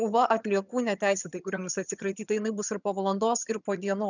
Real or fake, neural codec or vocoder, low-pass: fake; vocoder, 22.05 kHz, 80 mel bands, HiFi-GAN; 7.2 kHz